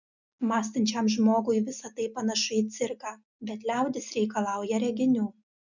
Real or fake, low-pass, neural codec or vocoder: real; 7.2 kHz; none